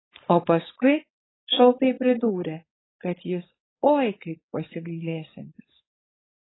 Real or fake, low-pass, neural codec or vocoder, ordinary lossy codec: fake; 7.2 kHz; codec, 16 kHz, 4.8 kbps, FACodec; AAC, 16 kbps